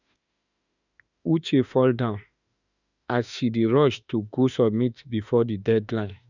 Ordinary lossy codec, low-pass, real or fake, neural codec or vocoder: none; 7.2 kHz; fake; autoencoder, 48 kHz, 32 numbers a frame, DAC-VAE, trained on Japanese speech